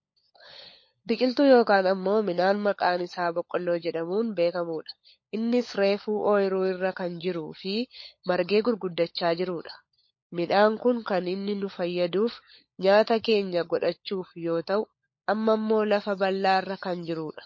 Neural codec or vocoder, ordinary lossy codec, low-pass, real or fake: codec, 16 kHz, 16 kbps, FunCodec, trained on LibriTTS, 50 frames a second; MP3, 32 kbps; 7.2 kHz; fake